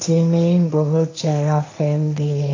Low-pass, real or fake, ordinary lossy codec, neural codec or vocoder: 7.2 kHz; fake; AAC, 48 kbps; codec, 16 kHz, 1.1 kbps, Voila-Tokenizer